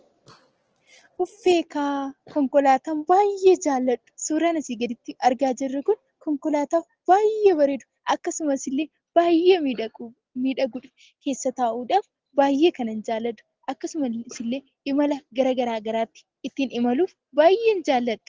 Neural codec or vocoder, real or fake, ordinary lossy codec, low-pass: none; real; Opus, 16 kbps; 7.2 kHz